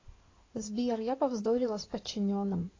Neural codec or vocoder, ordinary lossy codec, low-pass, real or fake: codec, 16 kHz in and 24 kHz out, 2.2 kbps, FireRedTTS-2 codec; AAC, 32 kbps; 7.2 kHz; fake